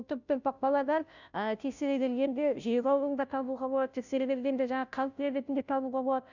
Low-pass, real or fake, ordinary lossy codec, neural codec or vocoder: 7.2 kHz; fake; none; codec, 16 kHz, 0.5 kbps, FunCodec, trained on Chinese and English, 25 frames a second